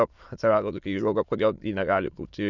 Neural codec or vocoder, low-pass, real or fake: autoencoder, 22.05 kHz, a latent of 192 numbers a frame, VITS, trained on many speakers; 7.2 kHz; fake